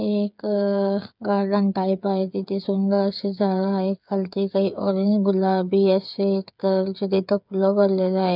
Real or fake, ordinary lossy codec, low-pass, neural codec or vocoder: fake; none; 5.4 kHz; codec, 16 kHz, 8 kbps, FreqCodec, smaller model